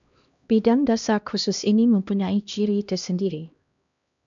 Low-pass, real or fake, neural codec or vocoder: 7.2 kHz; fake; codec, 16 kHz, 1 kbps, X-Codec, HuBERT features, trained on LibriSpeech